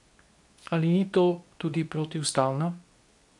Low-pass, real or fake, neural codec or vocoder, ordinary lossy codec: 10.8 kHz; fake; codec, 24 kHz, 0.9 kbps, WavTokenizer, medium speech release version 2; none